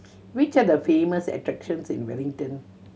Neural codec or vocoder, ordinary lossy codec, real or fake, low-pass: none; none; real; none